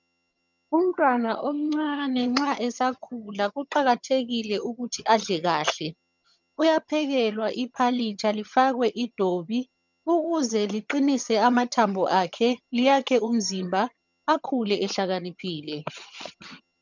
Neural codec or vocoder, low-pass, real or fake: vocoder, 22.05 kHz, 80 mel bands, HiFi-GAN; 7.2 kHz; fake